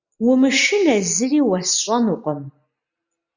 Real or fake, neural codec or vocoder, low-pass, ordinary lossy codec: real; none; 7.2 kHz; Opus, 64 kbps